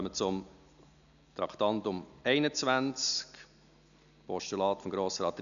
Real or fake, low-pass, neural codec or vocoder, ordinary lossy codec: real; 7.2 kHz; none; none